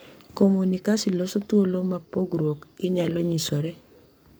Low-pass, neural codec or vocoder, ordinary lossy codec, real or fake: none; codec, 44.1 kHz, 7.8 kbps, Pupu-Codec; none; fake